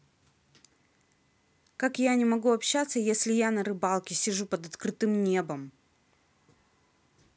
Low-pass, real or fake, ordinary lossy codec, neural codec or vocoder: none; real; none; none